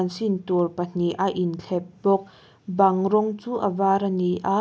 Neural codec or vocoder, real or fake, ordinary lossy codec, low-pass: none; real; none; none